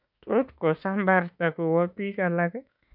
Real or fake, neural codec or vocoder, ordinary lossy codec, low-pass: fake; autoencoder, 48 kHz, 32 numbers a frame, DAC-VAE, trained on Japanese speech; none; 5.4 kHz